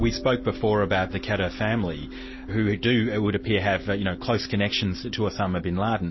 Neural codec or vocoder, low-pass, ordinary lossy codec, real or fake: none; 7.2 kHz; MP3, 24 kbps; real